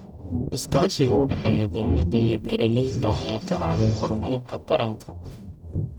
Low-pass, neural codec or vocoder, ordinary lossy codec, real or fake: 19.8 kHz; codec, 44.1 kHz, 0.9 kbps, DAC; none; fake